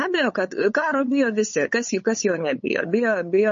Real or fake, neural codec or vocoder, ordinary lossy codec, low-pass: fake; codec, 16 kHz, 8 kbps, FunCodec, trained on LibriTTS, 25 frames a second; MP3, 32 kbps; 7.2 kHz